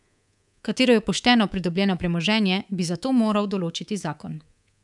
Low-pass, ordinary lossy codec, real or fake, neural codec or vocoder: 10.8 kHz; none; fake; codec, 24 kHz, 3.1 kbps, DualCodec